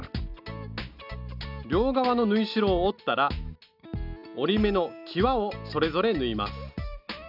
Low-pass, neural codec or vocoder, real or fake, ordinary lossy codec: 5.4 kHz; none; real; none